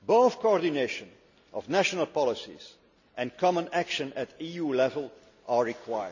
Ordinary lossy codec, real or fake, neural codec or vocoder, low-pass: none; real; none; 7.2 kHz